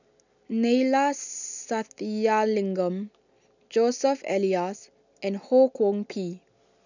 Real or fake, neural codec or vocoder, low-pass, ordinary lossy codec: real; none; 7.2 kHz; none